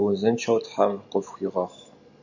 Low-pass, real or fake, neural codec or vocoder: 7.2 kHz; real; none